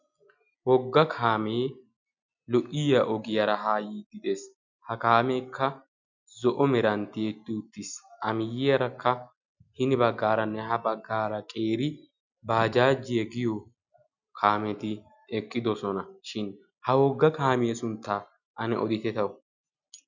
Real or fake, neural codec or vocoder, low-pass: real; none; 7.2 kHz